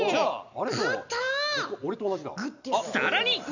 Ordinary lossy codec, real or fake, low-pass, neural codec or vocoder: none; real; 7.2 kHz; none